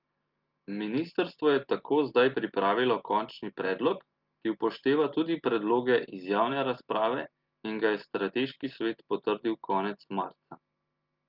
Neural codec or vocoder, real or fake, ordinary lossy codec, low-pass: none; real; Opus, 32 kbps; 5.4 kHz